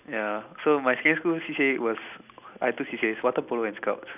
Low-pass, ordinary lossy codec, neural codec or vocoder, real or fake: 3.6 kHz; none; none; real